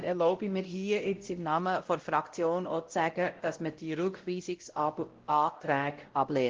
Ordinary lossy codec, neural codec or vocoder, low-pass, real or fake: Opus, 16 kbps; codec, 16 kHz, 0.5 kbps, X-Codec, WavLM features, trained on Multilingual LibriSpeech; 7.2 kHz; fake